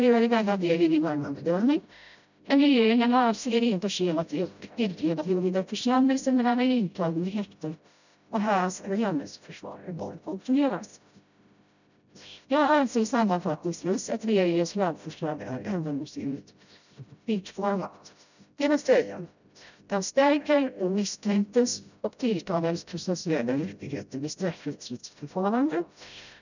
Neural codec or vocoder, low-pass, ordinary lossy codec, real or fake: codec, 16 kHz, 0.5 kbps, FreqCodec, smaller model; 7.2 kHz; none; fake